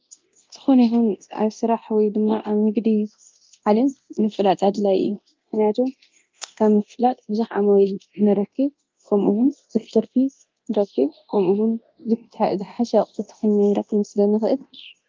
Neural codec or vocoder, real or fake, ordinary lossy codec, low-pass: codec, 24 kHz, 0.9 kbps, DualCodec; fake; Opus, 24 kbps; 7.2 kHz